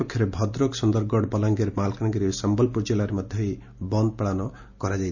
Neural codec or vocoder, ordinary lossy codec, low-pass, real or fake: none; none; 7.2 kHz; real